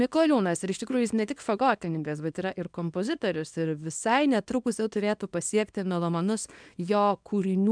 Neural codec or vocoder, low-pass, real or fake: codec, 24 kHz, 0.9 kbps, WavTokenizer, small release; 9.9 kHz; fake